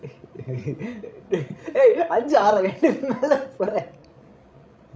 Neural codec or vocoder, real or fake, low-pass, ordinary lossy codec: codec, 16 kHz, 16 kbps, FreqCodec, larger model; fake; none; none